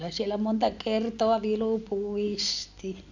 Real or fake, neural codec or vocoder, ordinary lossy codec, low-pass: real; none; none; 7.2 kHz